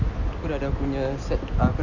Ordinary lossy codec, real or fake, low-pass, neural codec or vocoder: none; real; 7.2 kHz; none